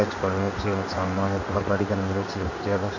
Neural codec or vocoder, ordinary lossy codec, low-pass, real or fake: codec, 16 kHz in and 24 kHz out, 1 kbps, XY-Tokenizer; none; 7.2 kHz; fake